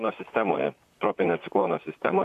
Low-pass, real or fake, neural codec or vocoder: 14.4 kHz; fake; vocoder, 44.1 kHz, 128 mel bands, Pupu-Vocoder